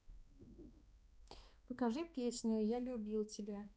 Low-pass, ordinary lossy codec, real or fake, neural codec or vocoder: none; none; fake; codec, 16 kHz, 2 kbps, X-Codec, HuBERT features, trained on balanced general audio